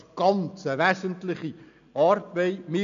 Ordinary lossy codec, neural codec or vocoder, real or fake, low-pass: none; none; real; 7.2 kHz